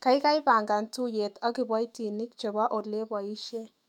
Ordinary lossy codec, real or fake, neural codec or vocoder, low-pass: MP3, 96 kbps; fake; autoencoder, 48 kHz, 128 numbers a frame, DAC-VAE, trained on Japanese speech; 19.8 kHz